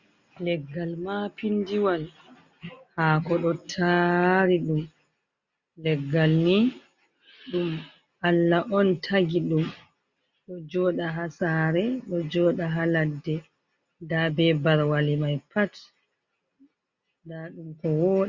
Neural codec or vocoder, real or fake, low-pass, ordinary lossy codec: none; real; 7.2 kHz; Opus, 64 kbps